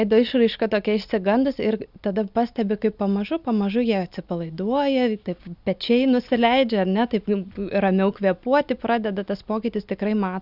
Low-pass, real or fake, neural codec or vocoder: 5.4 kHz; real; none